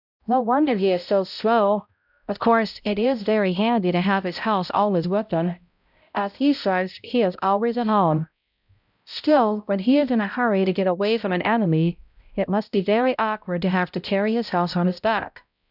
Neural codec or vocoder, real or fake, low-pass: codec, 16 kHz, 0.5 kbps, X-Codec, HuBERT features, trained on balanced general audio; fake; 5.4 kHz